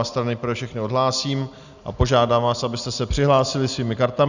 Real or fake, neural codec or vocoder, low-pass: real; none; 7.2 kHz